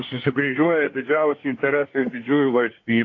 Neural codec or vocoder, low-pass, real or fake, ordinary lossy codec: codec, 24 kHz, 1 kbps, SNAC; 7.2 kHz; fake; AAC, 32 kbps